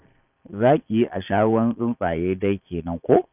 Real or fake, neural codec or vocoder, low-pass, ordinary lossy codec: fake; vocoder, 22.05 kHz, 80 mel bands, Vocos; 3.6 kHz; none